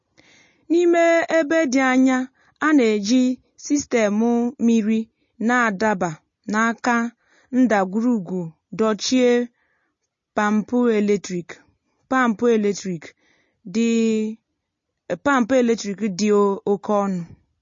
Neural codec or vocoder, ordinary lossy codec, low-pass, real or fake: none; MP3, 32 kbps; 7.2 kHz; real